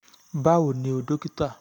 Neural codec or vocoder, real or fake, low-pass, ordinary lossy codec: none; real; 19.8 kHz; none